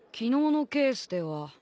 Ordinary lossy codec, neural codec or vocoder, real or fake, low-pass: none; none; real; none